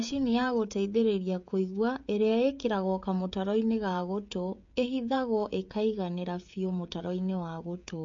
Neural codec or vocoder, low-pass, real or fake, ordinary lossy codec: codec, 16 kHz, 16 kbps, FreqCodec, smaller model; 7.2 kHz; fake; MP3, 48 kbps